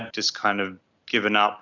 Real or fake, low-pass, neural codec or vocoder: real; 7.2 kHz; none